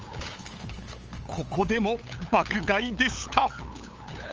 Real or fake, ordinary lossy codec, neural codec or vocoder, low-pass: fake; Opus, 24 kbps; codec, 16 kHz, 8 kbps, FunCodec, trained on LibriTTS, 25 frames a second; 7.2 kHz